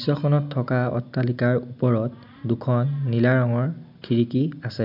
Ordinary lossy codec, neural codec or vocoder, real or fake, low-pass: none; none; real; 5.4 kHz